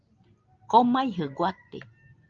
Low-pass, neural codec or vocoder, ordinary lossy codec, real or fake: 7.2 kHz; none; Opus, 24 kbps; real